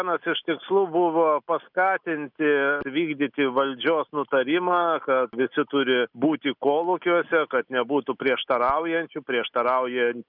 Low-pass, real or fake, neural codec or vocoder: 5.4 kHz; real; none